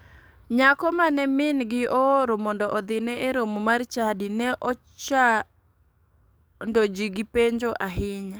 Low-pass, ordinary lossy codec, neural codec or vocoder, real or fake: none; none; codec, 44.1 kHz, 7.8 kbps, DAC; fake